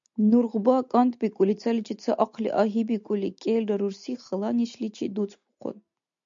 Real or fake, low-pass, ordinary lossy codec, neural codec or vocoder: real; 7.2 kHz; MP3, 64 kbps; none